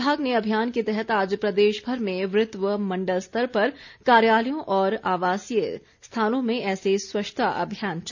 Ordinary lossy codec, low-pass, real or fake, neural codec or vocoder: none; 7.2 kHz; real; none